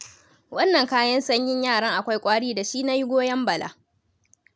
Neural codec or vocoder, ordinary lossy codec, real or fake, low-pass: none; none; real; none